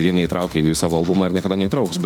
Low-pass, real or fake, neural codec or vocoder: 19.8 kHz; fake; autoencoder, 48 kHz, 32 numbers a frame, DAC-VAE, trained on Japanese speech